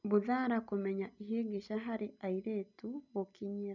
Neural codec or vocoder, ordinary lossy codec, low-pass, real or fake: none; none; 7.2 kHz; real